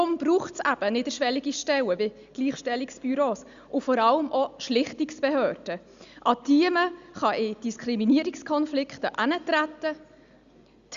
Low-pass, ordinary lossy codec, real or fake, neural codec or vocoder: 7.2 kHz; Opus, 64 kbps; real; none